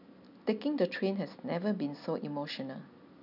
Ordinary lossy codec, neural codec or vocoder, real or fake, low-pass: none; none; real; 5.4 kHz